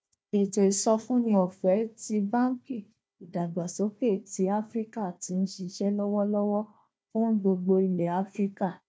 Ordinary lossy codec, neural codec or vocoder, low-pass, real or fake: none; codec, 16 kHz, 1 kbps, FunCodec, trained on Chinese and English, 50 frames a second; none; fake